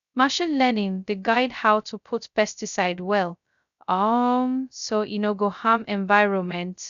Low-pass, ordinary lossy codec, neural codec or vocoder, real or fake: 7.2 kHz; none; codec, 16 kHz, 0.2 kbps, FocalCodec; fake